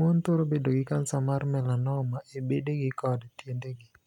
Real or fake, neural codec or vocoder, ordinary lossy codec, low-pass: real; none; none; 19.8 kHz